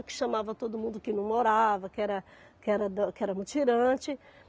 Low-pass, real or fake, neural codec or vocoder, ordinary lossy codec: none; real; none; none